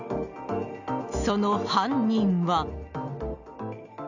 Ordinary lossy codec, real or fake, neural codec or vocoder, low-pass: none; real; none; 7.2 kHz